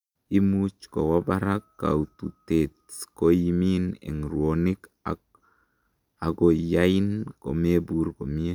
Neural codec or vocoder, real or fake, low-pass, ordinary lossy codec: none; real; 19.8 kHz; none